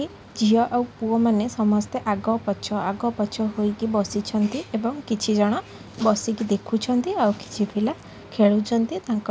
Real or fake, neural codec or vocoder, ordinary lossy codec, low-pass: real; none; none; none